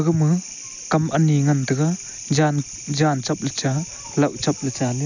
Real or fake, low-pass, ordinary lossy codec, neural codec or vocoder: real; 7.2 kHz; none; none